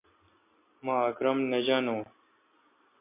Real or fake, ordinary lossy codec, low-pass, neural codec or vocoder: real; MP3, 24 kbps; 3.6 kHz; none